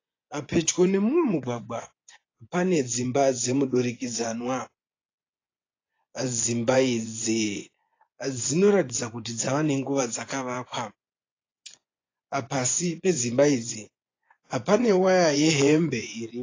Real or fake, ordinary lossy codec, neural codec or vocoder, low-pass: fake; AAC, 32 kbps; vocoder, 24 kHz, 100 mel bands, Vocos; 7.2 kHz